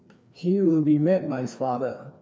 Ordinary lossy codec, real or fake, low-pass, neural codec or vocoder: none; fake; none; codec, 16 kHz, 2 kbps, FreqCodec, larger model